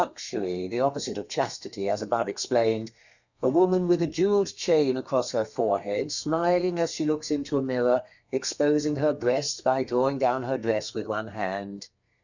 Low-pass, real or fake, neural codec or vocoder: 7.2 kHz; fake; codec, 32 kHz, 1.9 kbps, SNAC